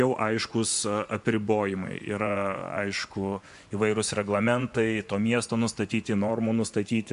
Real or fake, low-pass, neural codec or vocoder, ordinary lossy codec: fake; 10.8 kHz; vocoder, 24 kHz, 100 mel bands, Vocos; MP3, 64 kbps